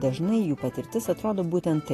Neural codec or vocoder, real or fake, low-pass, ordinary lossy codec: none; real; 14.4 kHz; AAC, 48 kbps